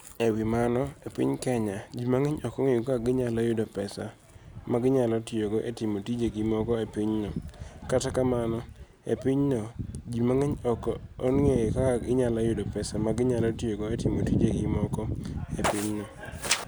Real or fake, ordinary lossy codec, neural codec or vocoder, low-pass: real; none; none; none